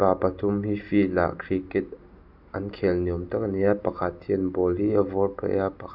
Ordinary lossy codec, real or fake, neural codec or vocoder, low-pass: none; real; none; 5.4 kHz